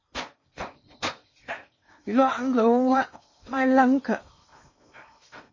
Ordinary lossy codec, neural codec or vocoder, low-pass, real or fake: MP3, 32 kbps; codec, 16 kHz in and 24 kHz out, 0.8 kbps, FocalCodec, streaming, 65536 codes; 7.2 kHz; fake